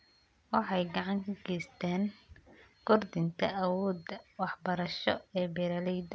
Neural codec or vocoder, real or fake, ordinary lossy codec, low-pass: none; real; none; none